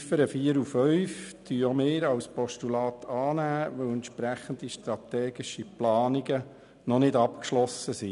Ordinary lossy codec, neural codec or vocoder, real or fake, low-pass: none; none; real; 10.8 kHz